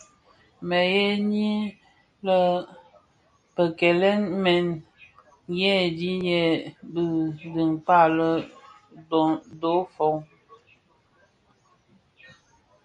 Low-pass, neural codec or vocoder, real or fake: 9.9 kHz; none; real